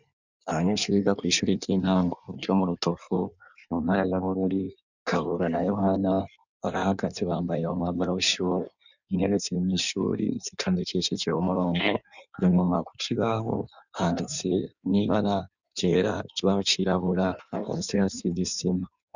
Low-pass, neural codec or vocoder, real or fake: 7.2 kHz; codec, 16 kHz in and 24 kHz out, 1.1 kbps, FireRedTTS-2 codec; fake